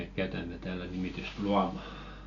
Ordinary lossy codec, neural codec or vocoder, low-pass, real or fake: none; none; 7.2 kHz; real